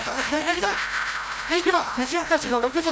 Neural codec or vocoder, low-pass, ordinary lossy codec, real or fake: codec, 16 kHz, 0.5 kbps, FreqCodec, larger model; none; none; fake